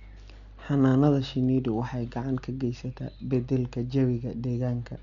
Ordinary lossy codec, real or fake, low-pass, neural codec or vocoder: none; real; 7.2 kHz; none